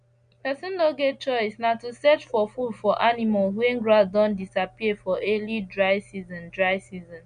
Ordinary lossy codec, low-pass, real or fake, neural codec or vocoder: MP3, 64 kbps; 9.9 kHz; real; none